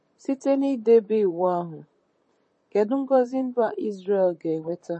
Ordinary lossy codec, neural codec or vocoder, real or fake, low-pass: MP3, 32 kbps; vocoder, 44.1 kHz, 128 mel bands, Pupu-Vocoder; fake; 10.8 kHz